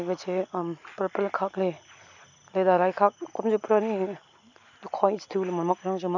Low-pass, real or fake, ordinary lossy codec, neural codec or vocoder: 7.2 kHz; real; none; none